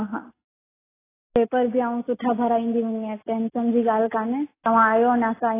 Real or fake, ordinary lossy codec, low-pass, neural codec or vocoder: real; AAC, 16 kbps; 3.6 kHz; none